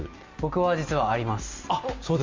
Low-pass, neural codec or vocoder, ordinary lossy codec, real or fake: 7.2 kHz; none; Opus, 32 kbps; real